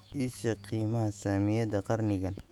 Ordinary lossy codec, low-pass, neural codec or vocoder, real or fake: none; 19.8 kHz; autoencoder, 48 kHz, 128 numbers a frame, DAC-VAE, trained on Japanese speech; fake